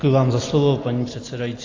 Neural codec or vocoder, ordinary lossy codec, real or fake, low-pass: none; AAC, 32 kbps; real; 7.2 kHz